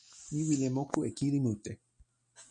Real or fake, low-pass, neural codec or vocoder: real; 9.9 kHz; none